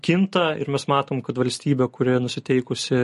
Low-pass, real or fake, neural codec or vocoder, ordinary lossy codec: 14.4 kHz; real; none; MP3, 48 kbps